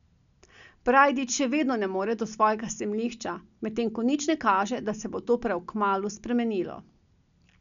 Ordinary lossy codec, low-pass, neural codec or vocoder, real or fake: none; 7.2 kHz; none; real